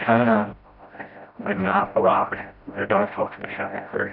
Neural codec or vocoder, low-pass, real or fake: codec, 16 kHz, 0.5 kbps, FreqCodec, smaller model; 5.4 kHz; fake